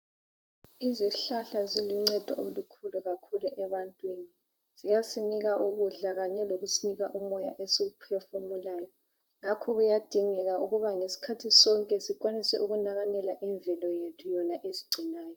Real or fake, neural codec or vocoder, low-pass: fake; vocoder, 44.1 kHz, 128 mel bands, Pupu-Vocoder; 19.8 kHz